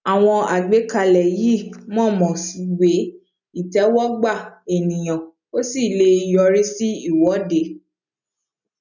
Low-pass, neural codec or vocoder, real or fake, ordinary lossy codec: 7.2 kHz; none; real; none